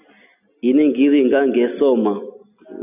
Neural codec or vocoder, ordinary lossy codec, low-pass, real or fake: none; AAC, 32 kbps; 3.6 kHz; real